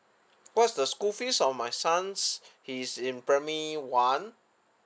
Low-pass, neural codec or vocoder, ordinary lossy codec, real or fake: none; none; none; real